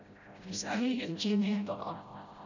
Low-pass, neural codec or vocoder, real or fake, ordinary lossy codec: 7.2 kHz; codec, 16 kHz, 0.5 kbps, FreqCodec, smaller model; fake; none